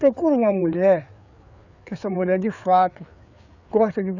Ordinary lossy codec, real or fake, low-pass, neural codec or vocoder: none; fake; 7.2 kHz; codec, 16 kHz, 4 kbps, FreqCodec, larger model